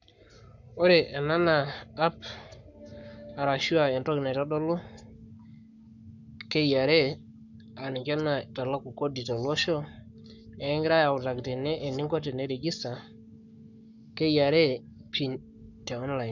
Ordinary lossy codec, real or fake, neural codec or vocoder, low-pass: none; fake; codec, 44.1 kHz, 7.8 kbps, Pupu-Codec; 7.2 kHz